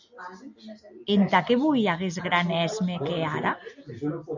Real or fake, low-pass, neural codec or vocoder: real; 7.2 kHz; none